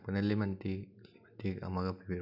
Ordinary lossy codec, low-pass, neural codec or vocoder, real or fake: none; 5.4 kHz; none; real